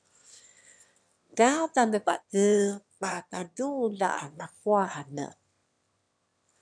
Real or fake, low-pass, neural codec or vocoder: fake; 9.9 kHz; autoencoder, 22.05 kHz, a latent of 192 numbers a frame, VITS, trained on one speaker